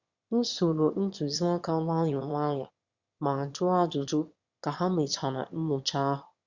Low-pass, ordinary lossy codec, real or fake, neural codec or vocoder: 7.2 kHz; Opus, 64 kbps; fake; autoencoder, 22.05 kHz, a latent of 192 numbers a frame, VITS, trained on one speaker